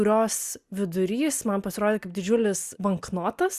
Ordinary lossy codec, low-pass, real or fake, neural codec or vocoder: Opus, 64 kbps; 14.4 kHz; real; none